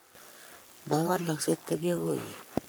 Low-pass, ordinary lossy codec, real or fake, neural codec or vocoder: none; none; fake; codec, 44.1 kHz, 3.4 kbps, Pupu-Codec